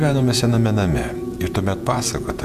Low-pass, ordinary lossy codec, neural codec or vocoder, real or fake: 14.4 kHz; MP3, 96 kbps; vocoder, 48 kHz, 128 mel bands, Vocos; fake